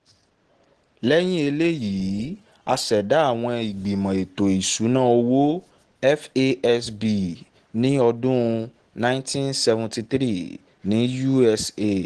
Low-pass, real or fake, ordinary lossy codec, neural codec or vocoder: 9.9 kHz; real; Opus, 16 kbps; none